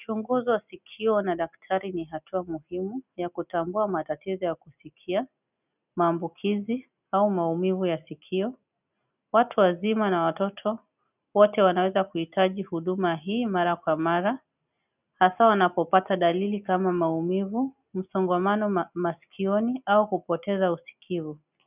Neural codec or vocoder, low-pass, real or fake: none; 3.6 kHz; real